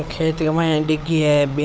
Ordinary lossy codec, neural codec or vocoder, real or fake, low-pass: none; codec, 16 kHz, 16 kbps, FunCodec, trained on LibriTTS, 50 frames a second; fake; none